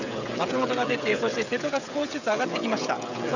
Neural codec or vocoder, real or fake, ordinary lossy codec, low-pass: codec, 16 kHz, 16 kbps, FreqCodec, smaller model; fake; none; 7.2 kHz